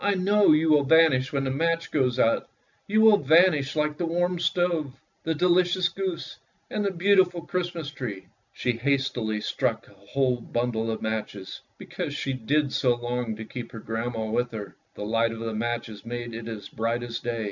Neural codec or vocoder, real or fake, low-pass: none; real; 7.2 kHz